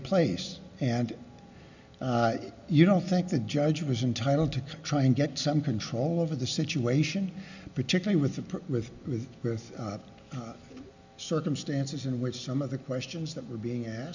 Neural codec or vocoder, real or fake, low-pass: none; real; 7.2 kHz